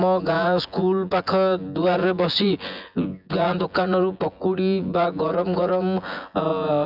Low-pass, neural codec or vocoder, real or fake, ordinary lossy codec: 5.4 kHz; vocoder, 24 kHz, 100 mel bands, Vocos; fake; none